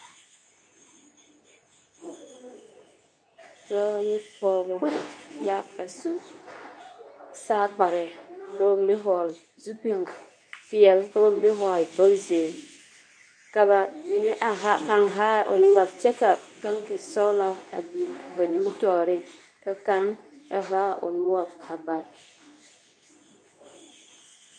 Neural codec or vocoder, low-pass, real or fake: codec, 24 kHz, 0.9 kbps, WavTokenizer, medium speech release version 2; 9.9 kHz; fake